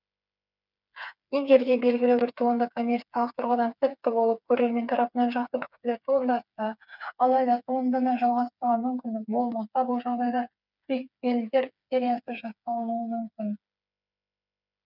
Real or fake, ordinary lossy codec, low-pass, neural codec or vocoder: fake; none; 5.4 kHz; codec, 16 kHz, 4 kbps, FreqCodec, smaller model